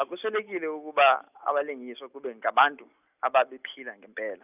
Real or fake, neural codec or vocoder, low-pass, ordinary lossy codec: real; none; 3.6 kHz; none